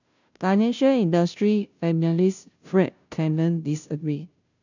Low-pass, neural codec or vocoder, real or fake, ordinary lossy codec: 7.2 kHz; codec, 16 kHz, 0.5 kbps, FunCodec, trained on Chinese and English, 25 frames a second; fake; none